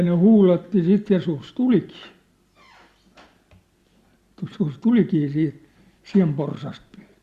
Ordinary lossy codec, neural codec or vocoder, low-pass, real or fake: Opus, 64 kbps; none; 14.4 kHz; real